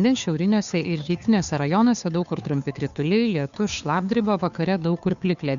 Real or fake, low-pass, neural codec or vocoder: fake; 7.2 kHz; codec, 16 kHz, 2 kbps, FunCodec, trained on Chinese and English, 25 frames a second